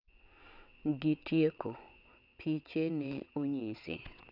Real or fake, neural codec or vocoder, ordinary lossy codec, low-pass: fake; vocoder, 22.05 kHz, 80 mel bands, WaveNeXt; none; 5.4 kHz